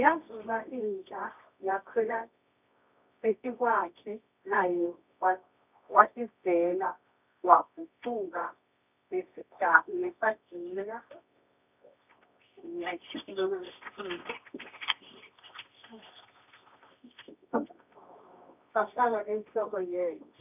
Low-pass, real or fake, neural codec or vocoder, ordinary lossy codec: 3.6 kHz; fake; codec, 24 kHz, 0.9 kbps, WavTokenizer, medium music audio release; none